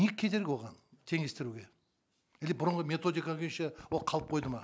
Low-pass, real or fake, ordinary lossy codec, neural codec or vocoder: none; real; none; none